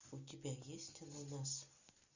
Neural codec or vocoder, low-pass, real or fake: none; 7.2 kHz; real